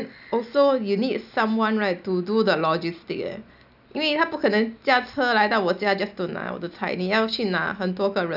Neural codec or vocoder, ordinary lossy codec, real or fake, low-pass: none; none; real; 5.4 kHz